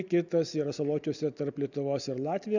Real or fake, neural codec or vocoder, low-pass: real; none; 7.2 kHz